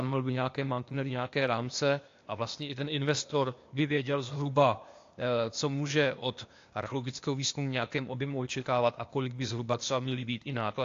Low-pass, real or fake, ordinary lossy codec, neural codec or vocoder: 7.2 kHz; fake; AAC, 48 kbps; codec, 16 kHz, 0.8 kbps, ZipCodec